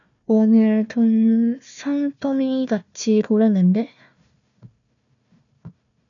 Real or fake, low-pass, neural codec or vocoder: fake; 7.2 kHz; codec, 16 kHz, 1 kbps, FunCodec, trained on Chinese and English, 50 frames a second